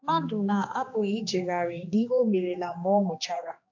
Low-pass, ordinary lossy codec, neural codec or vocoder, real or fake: 7.2 kHz; AAC, 48 kbps; codec, 16 kHz, 2 kbps, X-Codec, HuBERT features, trained on general audio; fake